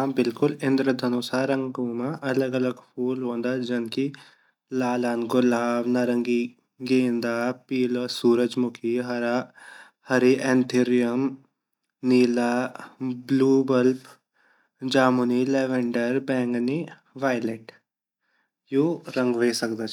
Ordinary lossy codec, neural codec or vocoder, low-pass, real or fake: none; none; 19.8 kHz; real